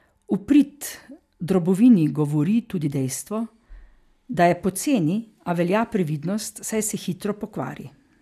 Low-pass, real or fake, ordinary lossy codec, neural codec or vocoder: 14.4 kHz; real; none; none